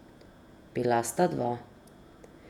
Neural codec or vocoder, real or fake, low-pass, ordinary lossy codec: vocoder, 48 kHz, 128 mel bands, Vocos; fake; 19.8 kHz; none